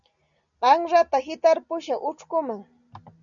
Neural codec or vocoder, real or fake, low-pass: none; real; 7.2 kHz